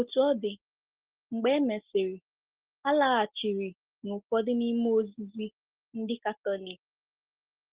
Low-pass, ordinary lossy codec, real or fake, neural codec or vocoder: 3.6 kHz; Opus, 16 kbps; real; none